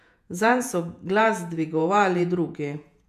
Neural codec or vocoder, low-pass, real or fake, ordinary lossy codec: vocoder, 48 kHz, 128 mel bands, Vocos; 14.4 kHz; fake; none